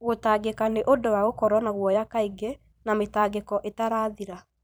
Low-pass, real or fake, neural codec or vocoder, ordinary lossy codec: none; fake; vocoder, 44.1 kHz, 128 mel bands every 512 samples, BigVGAN v2; none